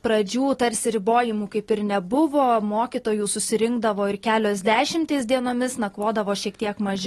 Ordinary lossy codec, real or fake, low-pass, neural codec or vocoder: AAC, 32 kbps; real; 19.8 kHz; none